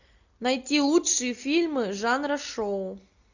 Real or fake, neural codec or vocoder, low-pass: real; none; 7.2 kHz